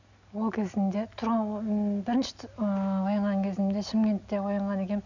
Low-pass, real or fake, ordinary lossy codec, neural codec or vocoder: 7.2 kHz; real; none; none